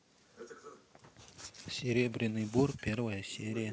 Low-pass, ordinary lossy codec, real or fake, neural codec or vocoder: none; none; real; none